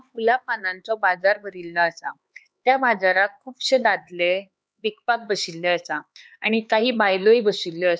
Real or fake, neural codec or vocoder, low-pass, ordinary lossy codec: fake; codec, 16 kHz, 4 kbps, X-Codec, HuBERT features, trained on LibriSpeech; none; none